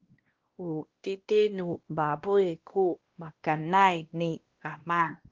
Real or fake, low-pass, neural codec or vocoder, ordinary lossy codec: fake; 7.2 kHz; codec, 16 kHz, 1 kbps, X-Codec, WavLM features, trained on Multilingual LibriSpeech; Opus, 16 kbps